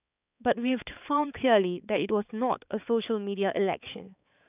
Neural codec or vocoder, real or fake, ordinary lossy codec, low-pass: codec, 16 kHz, 4 kbps, X-Codec, HuBERT features, trained on balanced general audio; fake; none; 3.6 kHz